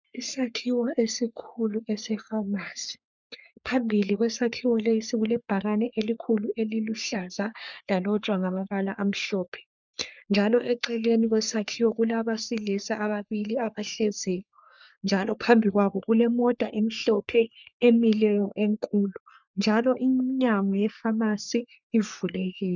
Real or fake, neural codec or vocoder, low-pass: fake; codec, 44.1 kHz, 3.4 kbps, Pupu-Codec; 7.2 kHz